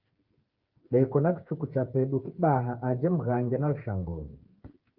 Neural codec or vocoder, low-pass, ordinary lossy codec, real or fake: codec, 16 kHz, 8 kbps, FreqCodec, smaller model; 5.4 kHz; Opus, 32 kbps; fake